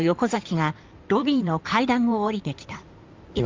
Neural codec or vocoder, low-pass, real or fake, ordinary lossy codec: codec, 16 kHz in and 24 kHz out, 2.2 kbps, FireRedTTS-2 codec; 7.2 kHz; fake; Opus, 32 kbps